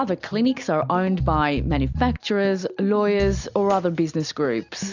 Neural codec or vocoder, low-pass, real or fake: none; 7.2 kHz; real